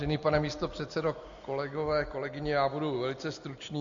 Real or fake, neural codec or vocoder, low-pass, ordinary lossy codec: real; none; 7.2 kHz; MP3, 64 kbps